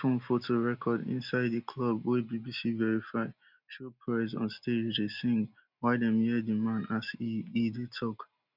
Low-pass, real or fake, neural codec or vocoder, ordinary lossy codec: 5.4 kHz; real; none; none